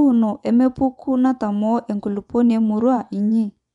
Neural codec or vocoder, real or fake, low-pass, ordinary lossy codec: vocoder, 24 kHz, 100 mel bands, Vocos; fake; 10.8 kHz; none